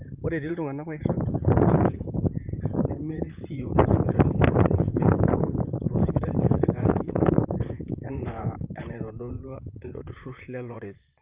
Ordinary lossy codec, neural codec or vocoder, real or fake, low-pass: Opus, 24 kbps; vocoder, 44.1 kHz, 128 mel bands, Pupu-Vocoder; fake; 3.6 kHz